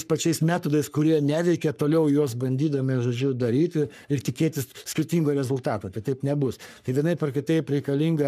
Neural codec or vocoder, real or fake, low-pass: codec, 44.1 kHz, 3.4 kbps, Pupu-Codec; fake; 14.4 kHz